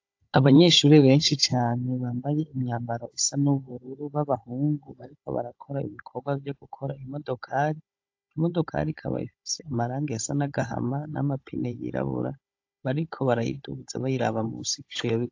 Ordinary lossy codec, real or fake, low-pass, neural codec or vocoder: AAC, 48 kbps; fake; 7.2 kHz; codec, 16 kHz, 16 kbps, FunCodec, trained on Chinese and English, 50 frames a second